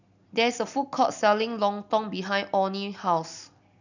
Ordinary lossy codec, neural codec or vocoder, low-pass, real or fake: none; none; 7.2 kHz; real